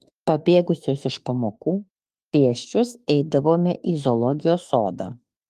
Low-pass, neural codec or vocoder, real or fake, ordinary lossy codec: 14.4 kHz; autoencoder, 48 kHz, 32 numbers a frame, DAC-VAE, trained on Japanese speech; fake; Opus, 32 kbps